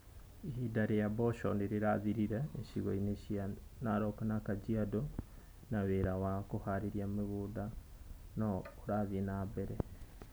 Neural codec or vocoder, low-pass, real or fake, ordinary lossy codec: none; none; real; none